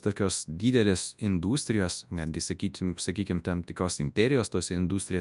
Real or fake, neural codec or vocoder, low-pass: fake; codec, 24 kHz, 0.9 kbps, WavTokenizer, large speech release; 10.8 kHz